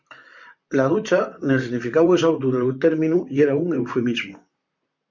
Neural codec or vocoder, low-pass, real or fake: vocoder, 44.1 kHz, 128 mel bands, Pupu-Vocoder; 7.2 kHz; fake